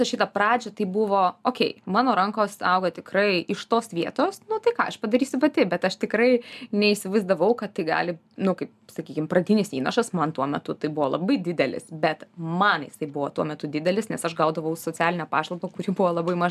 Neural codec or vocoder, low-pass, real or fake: none; 14.4 kHz; real